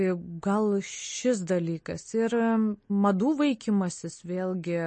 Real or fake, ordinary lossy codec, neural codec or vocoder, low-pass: real; MP3, 32 kbps; none; 9.9 kHz